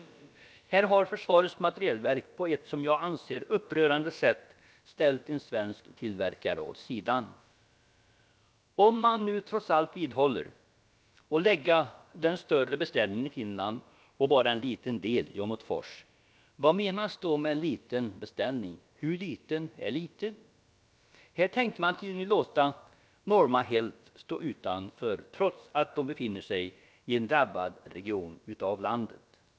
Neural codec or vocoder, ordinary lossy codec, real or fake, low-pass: codec, 16 kHz, about 1 kbps, DyCAST, with the encoder's durations; none; fake; none